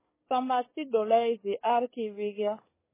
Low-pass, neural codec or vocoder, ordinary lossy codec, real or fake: 3.6 kHz; codec, 44.1 kHz, 7.8 kbps, DAC; MP3, 16 kbps; fake